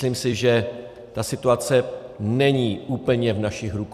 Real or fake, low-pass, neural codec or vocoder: fake; 14.4 kHz; vocoder, 44.1 kHz, 128 mel bands every 256 samples, BigVGAN v2